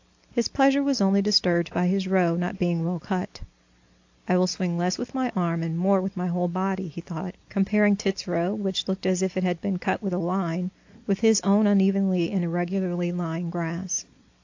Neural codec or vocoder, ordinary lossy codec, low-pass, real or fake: none; AAC, 48 kbps; 7.2 kHz; real